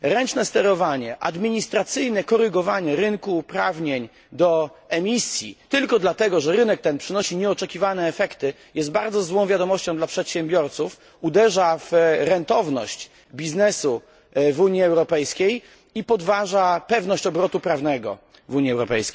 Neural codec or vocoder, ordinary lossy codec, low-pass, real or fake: none; none; none; real